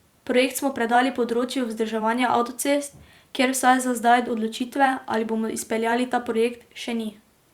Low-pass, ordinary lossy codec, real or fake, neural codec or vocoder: 19.8 kHz; Opus, 64 kbps; fake; vocoder, 44.1 kHz, 128 mel bands every 512 samples, BigVGAN v2